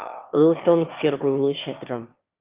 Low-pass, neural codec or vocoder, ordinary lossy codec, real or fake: 3.6 kHz; autoencoder, 22.05 kHz, a latent of 192 numbers a frame, VITS, trained on one speaker; Opus, 24 kbps; fake